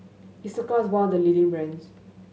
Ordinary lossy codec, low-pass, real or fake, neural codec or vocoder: none; none; real; none